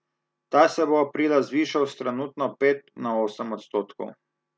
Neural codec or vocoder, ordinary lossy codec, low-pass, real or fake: none; none; none; real